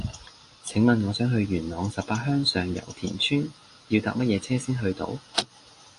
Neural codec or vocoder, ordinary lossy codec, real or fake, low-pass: none; AAC, 48 kbps; real; 10.8 kHz